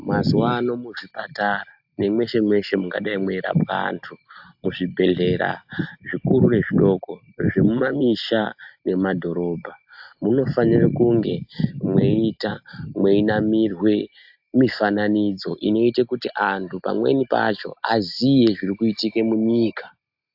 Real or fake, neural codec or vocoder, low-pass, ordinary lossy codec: real; none; 5.4 kHz; AAC, 48 kbps